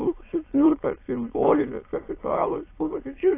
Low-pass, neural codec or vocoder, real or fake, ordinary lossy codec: 3.6 kHz; autoencoder, 22.05 kHz, a latent of 192 numbers a frame, VITS, trained on many speakers; fake; AAC, 24 kbps